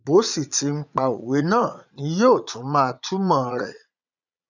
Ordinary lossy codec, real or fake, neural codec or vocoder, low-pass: none; fake; vocoder, 22.05 kHz, 80 mel bands, Vocos; 7.2 kHz